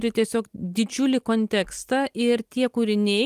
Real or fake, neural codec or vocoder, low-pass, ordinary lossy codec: real; none; 14.4 kHz; Opus, 24 kbps